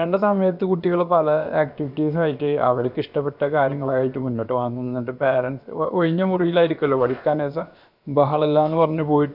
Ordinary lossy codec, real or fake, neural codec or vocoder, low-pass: none; fake; codec, 16 kHz, about 1 kbps, DyCAST, with the encoder's durations; 5.4 kHz